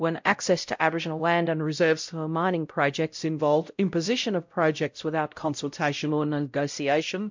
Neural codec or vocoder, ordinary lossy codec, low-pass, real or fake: codec, 16 kHz, 0.5 kbps, X-Codec, WavLM features, trained on Multilingual LibriSpeech; MP3, 64 kbps; 7.2 kHz; fake